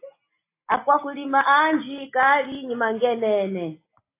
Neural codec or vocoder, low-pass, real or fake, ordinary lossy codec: none; 3.6 kHz; real; AAC, 24 kbps